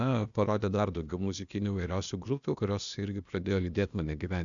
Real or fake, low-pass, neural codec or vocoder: fake; 7.2 kHz; codec, 16 kHz, 0.8 kbps, ZipCodec